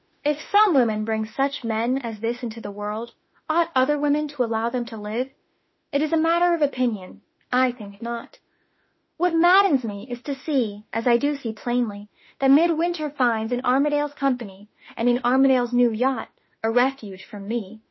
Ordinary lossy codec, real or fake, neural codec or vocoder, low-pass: MP3, 24 kbps; fake; autoencoder, 48 kHz, 32 numbers a frame, DAC-VAE, trained on Japanese speech; 7.2 kHz